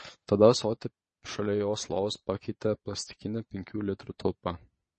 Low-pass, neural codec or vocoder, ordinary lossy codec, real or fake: 9.9 kHz; vocoder, 22.05 kHz, 80 mel bands, Vocos; MP3, 32 kbps; fake